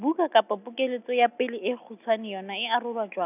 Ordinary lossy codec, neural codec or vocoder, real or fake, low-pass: none; none; real; 3.6 kHz